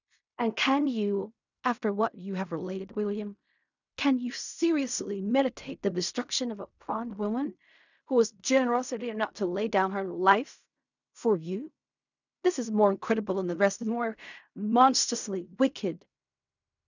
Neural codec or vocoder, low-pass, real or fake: codec, 16 kHz in and 24 kHz out, 0.4 kbps, LongCat-Audio-Codec, fine tuned four codebook decoder; 7.2 kHz; fake